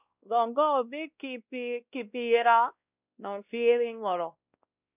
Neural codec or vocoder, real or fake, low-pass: codec, 16 kHz, 1 kbps, X-Codec, WavLM features, trained on Multilingual LibriSpeech; fake; 3.6 kHz